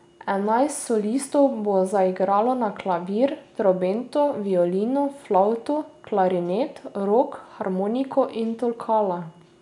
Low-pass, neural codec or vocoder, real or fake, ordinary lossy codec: 10.8 kHz; none; real; none